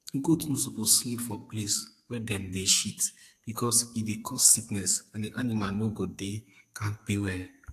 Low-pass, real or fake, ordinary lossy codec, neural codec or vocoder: 14.4 kHz; fake; AAC, 64 kbps; codec, 32 kHz, 1.9 kbps, SNAC